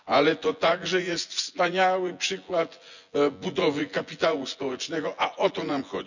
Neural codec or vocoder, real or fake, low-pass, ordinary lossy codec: vocoder, 24 kHz, 100 mel bands, Vocos; fake; 7.2 kHz; none